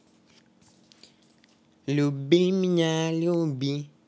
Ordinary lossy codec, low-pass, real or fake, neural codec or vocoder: none; none; real; none